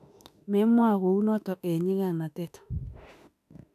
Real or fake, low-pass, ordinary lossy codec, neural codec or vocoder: fake; 14.4 kHz; none; autoencoder, 48 kHz, 32 numbers a frame, DAC-VAE, trained on Japanese speech